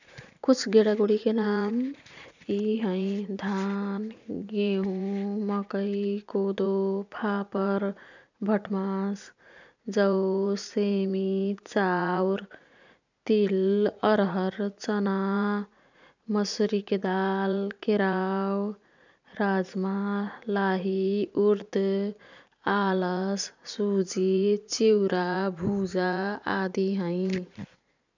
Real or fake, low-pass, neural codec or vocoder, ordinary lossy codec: fake; 7.2 kHz; vocoder, 44.1 kHz, 80 mel bands, Vocos; none